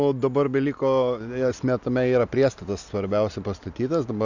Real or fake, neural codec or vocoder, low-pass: real; none; 7.2 kHz